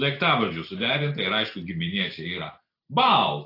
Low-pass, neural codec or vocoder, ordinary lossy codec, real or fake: 5.4 kHz; none; AAC, 32 kbps; real